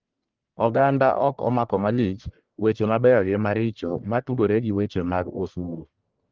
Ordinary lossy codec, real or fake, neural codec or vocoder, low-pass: Opus, 32 kbps; fake; codec, 44.1 kHz, 1.7 kbps, Pupu-Codec; 7.2 kHz